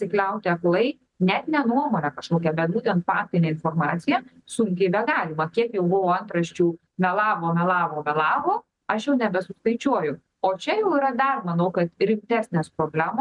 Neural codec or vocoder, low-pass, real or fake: none; 10.8 kHz; real